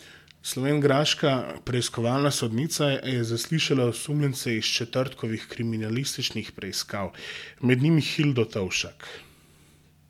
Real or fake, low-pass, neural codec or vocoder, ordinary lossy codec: real; 19.8 kHz; none; MP3, 96 kbps